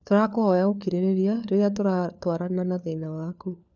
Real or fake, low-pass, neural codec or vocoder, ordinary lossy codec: fake; 7.2 kHz; codec, 16 kHz, 4 kbps, FunCodec, trained on LibriTTS, 50 frames a second; none